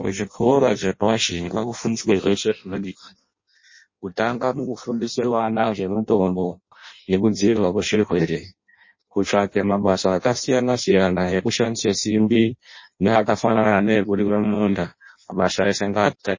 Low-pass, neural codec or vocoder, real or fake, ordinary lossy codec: 7.2 kHz; codec, 16 kHz in and 24 kHz out, 0.6 kbps, FireRedTTS-2 codec; fake; MP3, 32 kbps